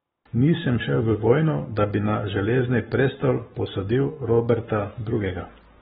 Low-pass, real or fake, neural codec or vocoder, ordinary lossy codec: 19.8 kHz; fake; codec, 44.1 kHz, 7.8 kbps, Pupu-Codec; AAC, 16 kbps